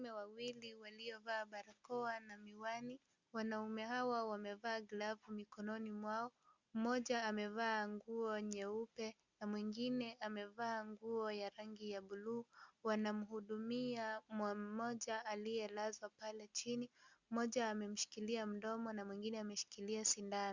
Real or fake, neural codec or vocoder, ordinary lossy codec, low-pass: real; none; Opus, 64 kbps; 7.2 kHz